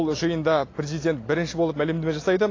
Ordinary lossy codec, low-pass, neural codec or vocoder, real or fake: AAC, 32 kbps; 7.2 kHz; none; real